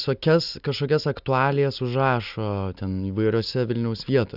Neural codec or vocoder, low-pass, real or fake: none; 5.4 kHz; real